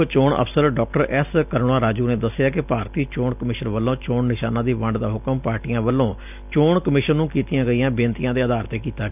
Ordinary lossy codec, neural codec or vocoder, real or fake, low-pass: none; none; real; 3.6 kHz